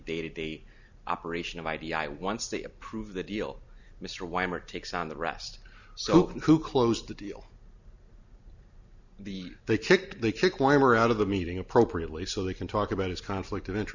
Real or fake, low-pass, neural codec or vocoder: real; 7.2 kHz; none